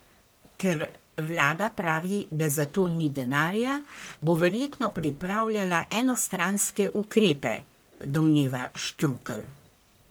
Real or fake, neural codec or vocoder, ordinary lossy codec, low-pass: fake; codec, 44.1 kHz, 1.7 kbps, Pupu-Codec; none; none